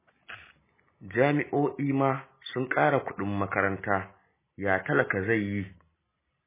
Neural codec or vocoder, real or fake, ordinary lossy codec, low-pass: none; real; MP3, 16 kbps; 3.6 kHz